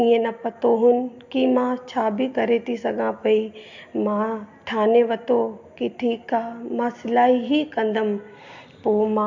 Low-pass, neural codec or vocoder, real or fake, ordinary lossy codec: 7.2 kHz; none; real; MP3, 48 kbps